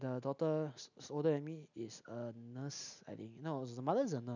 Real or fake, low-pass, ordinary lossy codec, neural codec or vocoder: real; 7.2 kHz; none; none